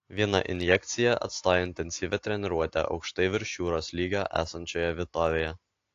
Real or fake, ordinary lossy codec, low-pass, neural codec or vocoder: real; AAC, 48 kbps; 7.2 kHz; none